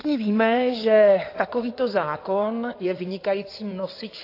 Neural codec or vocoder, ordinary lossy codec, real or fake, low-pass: codec, 16 kHz in and 24 kHz out, 2.2 kbps, FireRedTTS-2 codec; AAC, 32 kbps; fake; 5.4 kHz